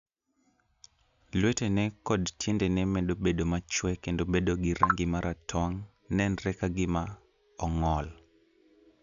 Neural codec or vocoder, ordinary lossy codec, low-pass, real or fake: none; none; 7.2 kHz; real